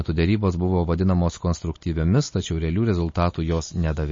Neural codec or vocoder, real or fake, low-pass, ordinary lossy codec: none; real; 7.2 kHz; MP3, 32 kbps